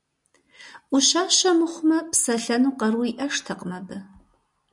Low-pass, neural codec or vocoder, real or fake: 10.8 kHz; none; real